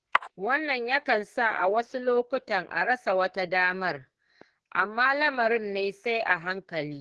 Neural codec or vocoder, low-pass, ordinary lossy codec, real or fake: codec, 44.1 kHz, 2.6 kbps, SNAC; 10.8 kHz; Opus, 16 kbps; fake